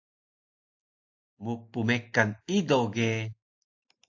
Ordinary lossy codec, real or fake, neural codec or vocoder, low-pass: AAC, 48 kbps; real; none; 7.2 kHz